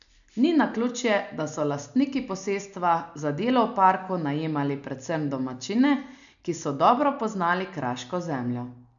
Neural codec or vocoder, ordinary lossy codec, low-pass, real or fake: none; none; 7.2 kHz; real